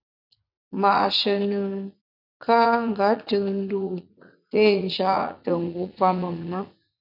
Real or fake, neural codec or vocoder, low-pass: fake; vocoder, 44.1 kHz, 80 mel bands, Vocos; 5.4 kHz